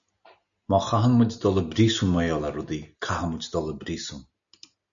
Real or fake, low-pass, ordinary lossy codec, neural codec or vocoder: real; 7.2 kHz; MP3, 96 kbps; none